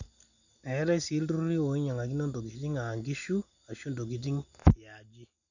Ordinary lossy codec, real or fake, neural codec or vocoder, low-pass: none; real; none; 7.2 kHz